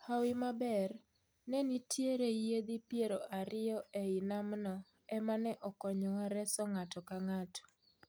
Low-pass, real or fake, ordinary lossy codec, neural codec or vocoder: none; real; none; none